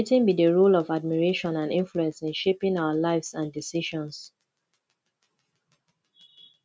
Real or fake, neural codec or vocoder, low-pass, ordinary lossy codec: real; none; none; none